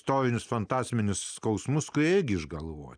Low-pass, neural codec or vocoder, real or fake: 9.9 kHz; none; real